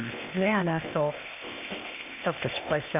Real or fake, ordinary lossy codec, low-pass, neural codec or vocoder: fake; none; 3.6 kHz; codec, 16 kHz, 0.8 kbps, ZipCodec